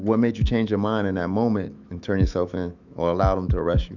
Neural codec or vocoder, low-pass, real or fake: autoencoder, 48 kHz, 128 numbers a frame, DAC-VAE, trained on Japanese speech; 7.2 kHz; fake